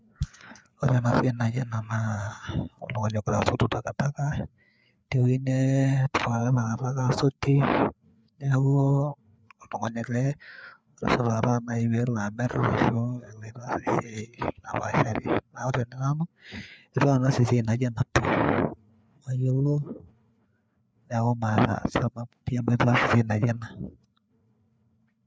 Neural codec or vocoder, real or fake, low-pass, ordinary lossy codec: codec, 16 kHz, 4 kbps, FreqCodec, larger model; fake; none; none